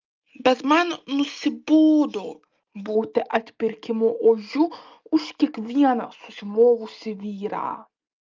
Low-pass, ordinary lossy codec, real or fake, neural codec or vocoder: 7.2 kHz; Opus, 24 kbps; real; none